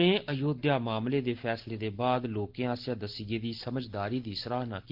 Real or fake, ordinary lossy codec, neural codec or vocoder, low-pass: real; Opus, 16 kbps; none; 5.4 kHz